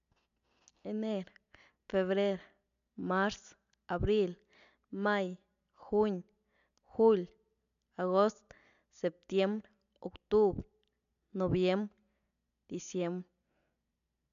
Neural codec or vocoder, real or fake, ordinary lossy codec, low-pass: none; real; AAC, 96 kbps; 7.2 kHz